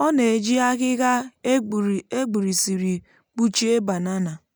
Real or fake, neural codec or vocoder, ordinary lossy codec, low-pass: real; none; none; none